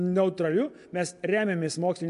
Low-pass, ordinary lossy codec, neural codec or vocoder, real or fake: 10.8 kHz; MP3, 64 kbps; none; real